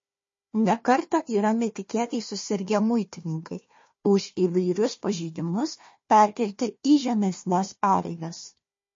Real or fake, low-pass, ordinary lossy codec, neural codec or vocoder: fake; 7.2 kHz; MP3, 32 kbps; codec, 16 kHz, 1 kbps, FunCodec, trained on Chinese and English, 50 frames a second